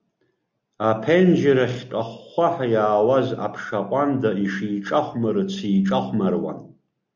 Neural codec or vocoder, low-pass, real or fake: none; 7.2 kHz; real